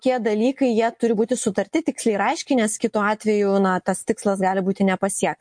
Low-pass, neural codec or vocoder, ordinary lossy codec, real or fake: 9.9 kHz; none; MP3, 48 kbps; real